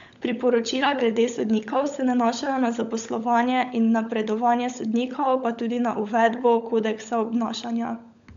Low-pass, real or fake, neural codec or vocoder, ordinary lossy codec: 7.2 kHz; fake; codec, 16 kHz, 16 kbps, FunCodec, trained on LibriTTS, 50 frames a second; MP3, 64 kbps